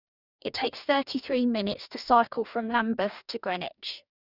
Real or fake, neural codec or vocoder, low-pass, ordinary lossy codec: fake; codec, 44.1 kHz, 2.6 kbps, DAC; 5.4 kHz; none